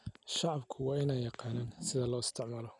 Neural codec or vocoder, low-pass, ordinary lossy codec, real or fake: none; 10.8 kHz; none; real